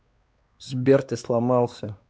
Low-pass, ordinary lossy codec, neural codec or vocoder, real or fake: none; none; codec, 16 kHz, 2 kbps, X-Codec, WavLM features, trained on Multilingual LibriSpeech; fake